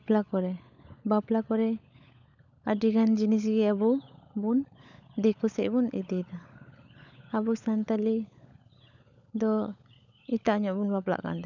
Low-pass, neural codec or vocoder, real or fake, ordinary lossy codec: 7.2 kHz; codec, 16 kHz, 16 kbps, FunCodec, trained on LibriTTS, 50 frames a second; fake; none